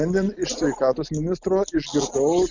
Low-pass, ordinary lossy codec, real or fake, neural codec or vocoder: 7.2 kHz; Opus, 64 kbps; real; none